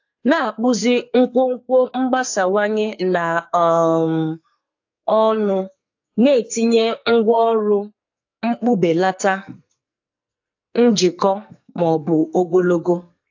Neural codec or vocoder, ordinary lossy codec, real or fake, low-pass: codec, 44.1 kHz, 2.6 kbps, SNAC; AAC, 48 kbps; fake; 7.2 kHz